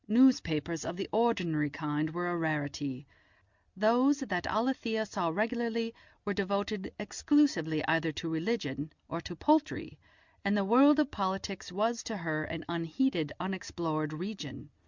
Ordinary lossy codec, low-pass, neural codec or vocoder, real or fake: Opus, 64 kbps; 7.2 kHz; none; real